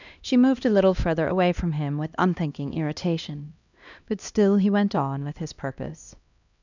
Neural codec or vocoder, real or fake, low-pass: codec, 16 kHz, 1 kbps, X-Codec, HuBERT features, trained on LibriSpeech; fake; 7.2 kHz